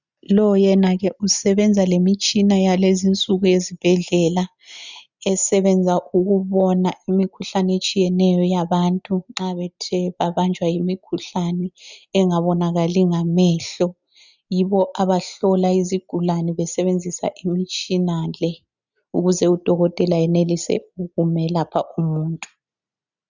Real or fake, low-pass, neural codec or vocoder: real; 7.2 kHz; none